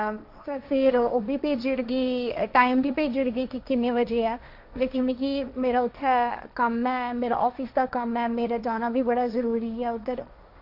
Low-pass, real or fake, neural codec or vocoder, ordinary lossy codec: 5.4 kHz; fake; codec, 16 kHz, 1.1 kbps, Voila-Tokenizer; none